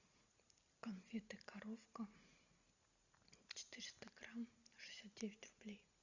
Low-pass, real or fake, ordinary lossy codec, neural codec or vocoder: 7.2 kHz; real; Opus, 64 kbps; none